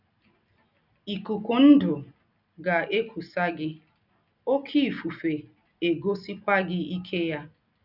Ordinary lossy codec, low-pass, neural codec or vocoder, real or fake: none; 5.4 kHz; none; real